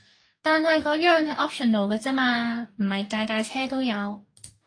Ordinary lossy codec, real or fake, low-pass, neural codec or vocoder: AAC, 48 kbps; fake; 9.9 kHz; codec, 44.1 kHz, 2.6 kbps, DAC